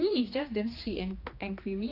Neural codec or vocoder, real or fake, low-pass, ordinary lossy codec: codec, 16 kHz, 2 kbps, X-Codec, HuBERT features, trained on general audio; fake; 5.4 kHz; AAC, 32 kbps